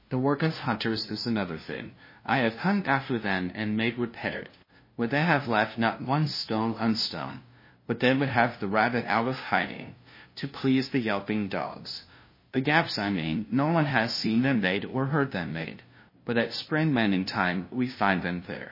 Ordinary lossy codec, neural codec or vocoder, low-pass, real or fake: MP3, 24 kbps; codec, 16 kHz, 0.5 kbps, FunCodec, trained on LibriTTS, 25 frames a second; 5.4 kHz; fake